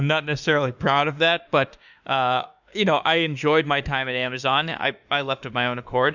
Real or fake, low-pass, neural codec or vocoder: fake; 7.2 kHz; autoencoder, 48 kHz, 32 numbers a frame, DAC-VAE, trained on Japanese speech